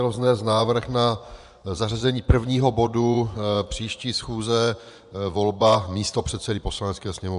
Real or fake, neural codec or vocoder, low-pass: fake; vocoder, 24 kHz, 100 mel bands, Vocos; 10.8 kHz